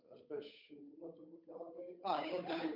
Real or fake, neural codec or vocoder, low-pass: fake; codec, 16 kHz, 8 kbps, FunCodec, trained on Chinese and English, 25 frames a second; 5.4 kHz